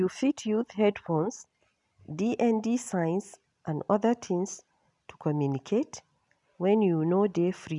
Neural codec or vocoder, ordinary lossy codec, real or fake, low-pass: none; none; real; 10.8 kHz